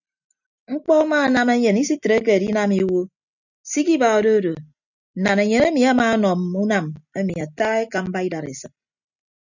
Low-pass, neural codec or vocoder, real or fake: 7.2 kHz; none; real